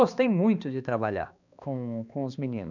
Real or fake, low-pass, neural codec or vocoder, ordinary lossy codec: fake; 7.2 kHz; codec, 16 kHz, 2 kbps, X-Codec, HuBERT features, trained on balanced general audio; none